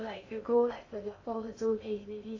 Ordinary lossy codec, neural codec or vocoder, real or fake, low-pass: AAC, 32 kbps; codec, 16 kHz in and 24 kHz out, 0.8 kbps, FocalCodec, streaming, 65536 codes; fake; 7.2 kHz